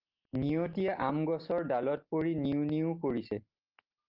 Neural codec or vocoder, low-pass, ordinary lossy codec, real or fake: none; 5.4 kHz; Opus, 24 kbps; real